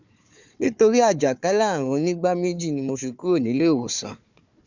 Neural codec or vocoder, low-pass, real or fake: codec, 16 kHz, 4 kbps, FunCodec, trained on Chinese and English, 50 frames a second; 7.2 kHz; fake